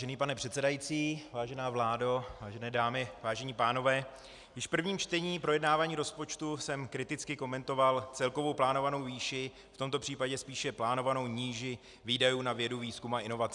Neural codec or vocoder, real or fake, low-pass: none; real; 10.8 kHz